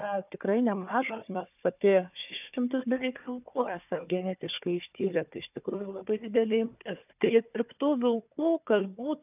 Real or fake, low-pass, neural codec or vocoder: fake; 3.6 kHz; codec, 16 kHz, 4 kbps, FunCodec, trained on Chinese and English, 50 frames a second